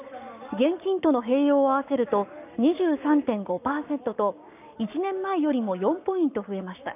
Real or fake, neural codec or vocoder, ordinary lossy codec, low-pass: fake; codec, 44.1 kHz, 7.8 kbps, Pupu-Codec; none; 3.6 kHz